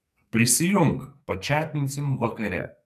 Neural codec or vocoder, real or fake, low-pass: codec, 44.1 kHz, 2.6 kbps, SNAC; fake; 14.4 kHz